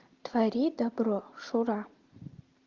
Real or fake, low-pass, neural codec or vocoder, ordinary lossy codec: real; 7.2 kHz; none; Opus, 32 kbps